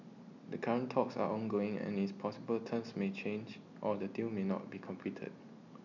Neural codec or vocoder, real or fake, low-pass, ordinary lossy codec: none; real; 7.2 kHz; none